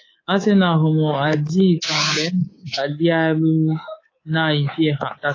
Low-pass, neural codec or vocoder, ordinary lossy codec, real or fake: 7.2 kHz; codec, 24 kHz, 3.1 kbps, DualCodec; AAC, 32 kbps; fake